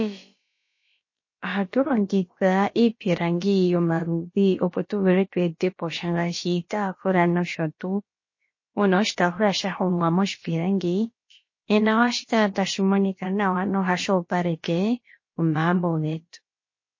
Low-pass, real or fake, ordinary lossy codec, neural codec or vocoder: 7.2 kHz; fake; MP3, 32 kbps; codec, 16 kHz, about 1 kbps, DyCAST, with the encoder's durations